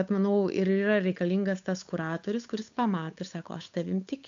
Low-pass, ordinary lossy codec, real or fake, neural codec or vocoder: 7.2 kHz; AAC, 64 kbps; fake; codec, 16 kHz, 8 kbps, FunCodec, trained on Chinese and English, 25 frames a second